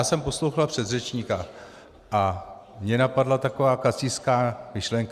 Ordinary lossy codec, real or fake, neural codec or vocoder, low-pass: Opus, 64 kbps; real; none; 14.4 kHz